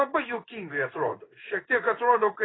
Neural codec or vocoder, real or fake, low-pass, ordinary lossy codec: codec, 16 kHz in and 24 kHz out, 1 kbps, XY-Tokenizer; fake; 7.2 kHz; AAC, 16 kbps